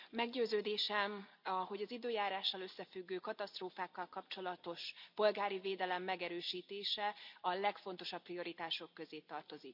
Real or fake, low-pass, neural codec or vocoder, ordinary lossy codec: real; 5.4 kHz; none; none